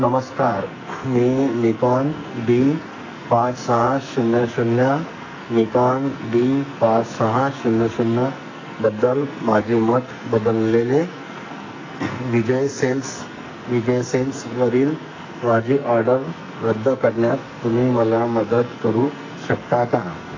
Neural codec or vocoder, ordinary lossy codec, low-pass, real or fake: codec, 44.1 kHz, 2.6 kbps, SNAC; AAC, 32 kbps; 7.2 kHz; fake